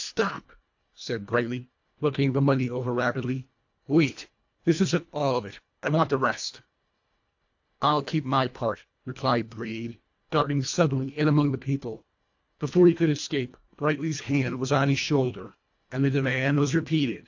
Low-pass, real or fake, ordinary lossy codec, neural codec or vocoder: 7.2 kHz; fake; AAC, 48 kbps; codec, 24 kHz, 1.5 kbps, HILCodec